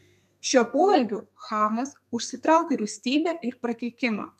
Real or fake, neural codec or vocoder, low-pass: fake; codec, 32 kHz, 1.9 kbps, SNAC; 14.4 kHz